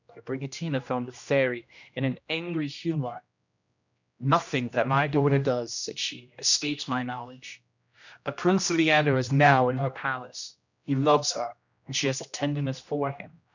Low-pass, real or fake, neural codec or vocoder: 7.2 kHz; fake; codec, 16 kHz, 1 kbps, X-Codec, HuBERT features, trained on general audio